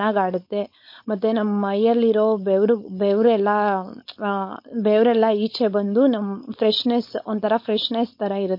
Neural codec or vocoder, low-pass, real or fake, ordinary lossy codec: codec, 16 kHz, 4.8 kbps, FACodec; 5.4 kHz; fake; MP3, 32 kbps